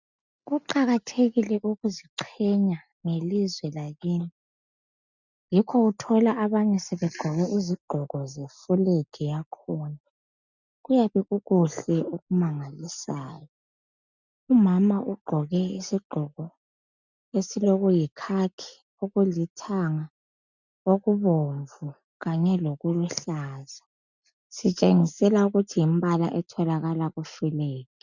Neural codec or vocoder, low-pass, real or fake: none; 7.2 kHz; real